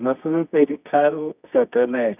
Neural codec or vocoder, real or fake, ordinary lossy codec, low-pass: codec, 24 kHz, 0.9 kbps, WavTokenizer, medium music audio release; fake; none; 3.6 kHz